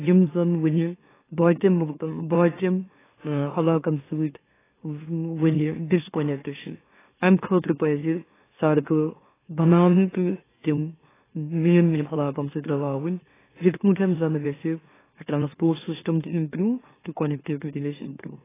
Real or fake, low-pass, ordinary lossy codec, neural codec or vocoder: fake; 3.6 kHz; AAC, 16 kbps; autoencoder, 44.1 kHz, a latent of 192 numbers a frame, MeloTTS